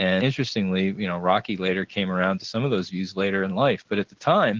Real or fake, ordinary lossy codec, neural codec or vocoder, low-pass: real; Opus, 16 kbps; none; 7.2 kHz